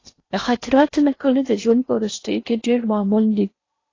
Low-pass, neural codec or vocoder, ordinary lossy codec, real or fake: 7.2 kHz; codec, 16 kHz in and 24 kHz out, 0.6 kbps, FocalCodec, streaming, 4096 codes; AAC, 32 kbps; fake